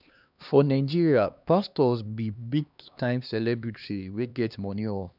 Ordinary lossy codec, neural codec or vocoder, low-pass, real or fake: none; codec, 16 kHz, 2 kbps, X-Codec, HuBERT features, trained on LibriSpeech; 5.4 kHz; fake